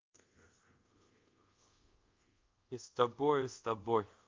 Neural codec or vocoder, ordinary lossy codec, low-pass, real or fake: codec, 24 kHz, 0.5 kbps, DualCodec; Opus, 24 kbps; 7.2 kHz; fake